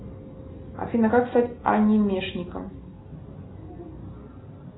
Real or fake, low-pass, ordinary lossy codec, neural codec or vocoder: real; 7.2 kHz; AAC, 16 kbps; none